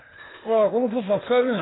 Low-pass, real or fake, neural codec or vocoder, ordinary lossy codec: 7.2 kHz; fake; codec, 16 kHz, 0.8 kbps, ZipCodec; AAC, 16 kbps